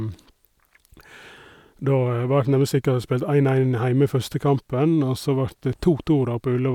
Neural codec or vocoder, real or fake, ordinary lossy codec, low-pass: none; real; none; 19.8 kHz